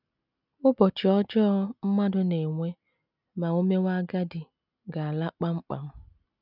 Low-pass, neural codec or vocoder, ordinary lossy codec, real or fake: 5.4 kHz; none; none; real